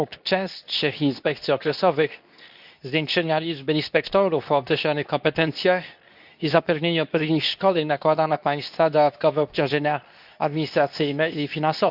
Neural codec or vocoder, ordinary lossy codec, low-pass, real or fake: codec, 24 kHz, 0.9 kbps, WavTokenizer, medium speech release version 2; none; 5.4 kHz; fake